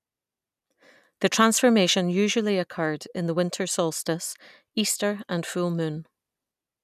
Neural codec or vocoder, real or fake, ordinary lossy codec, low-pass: none; real; none; 14.4 kHz